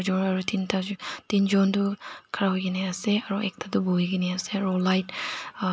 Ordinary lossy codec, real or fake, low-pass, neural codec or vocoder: none; real; none; none